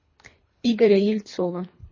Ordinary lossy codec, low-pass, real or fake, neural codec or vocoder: MP3, 32 kbps; 7.2 kHz; fake; codec, 24 kHz, 3 kbps, HILCodec